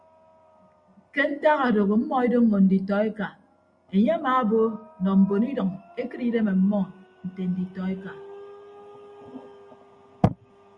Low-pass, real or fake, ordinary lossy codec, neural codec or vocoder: 9.9 kHz; real; Opus, 64 kbps; none